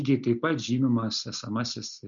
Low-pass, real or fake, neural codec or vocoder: 7.2 kHz; real; none